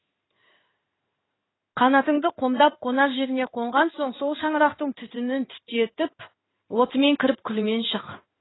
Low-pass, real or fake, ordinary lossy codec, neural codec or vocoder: 7.2 kHz; fake; AAC, 16 kbps; codec, 16 kHz in and 24 kHz out, 1 kbps, XY-Tokenizer